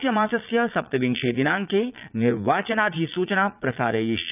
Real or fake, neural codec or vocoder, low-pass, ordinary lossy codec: fake; vocoder, 22.05 kHz, 80 mel bands, Vocos; 3.6 kHz; none